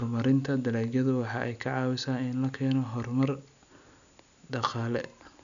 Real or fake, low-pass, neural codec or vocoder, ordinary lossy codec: real; 7.2 kHz; none; none